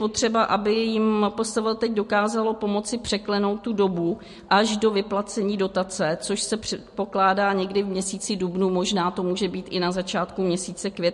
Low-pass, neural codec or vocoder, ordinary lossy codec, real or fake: 14.4 kHz; none; MP3, 48 kbps; real